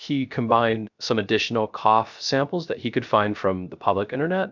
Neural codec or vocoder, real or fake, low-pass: codec, 16 kHz, 0.3 kbps, FocalCodec; fake; 7.2 kHz